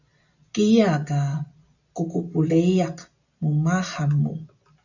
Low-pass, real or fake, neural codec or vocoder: 7.2 kHz; real; none